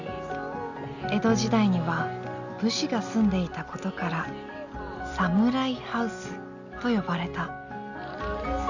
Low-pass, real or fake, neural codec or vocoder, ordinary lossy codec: 7.2 kHz; real; none; Opus, 64 kbps